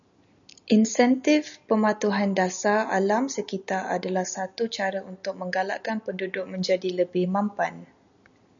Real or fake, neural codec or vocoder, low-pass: real; none; 7.2 kHz